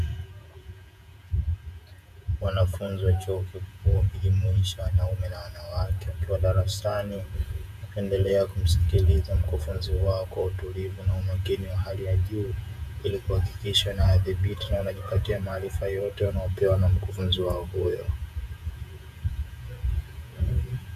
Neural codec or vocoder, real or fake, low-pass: vocoder, 48 kHz, 128 mel bands, Vocos; fake; 14.4 kHz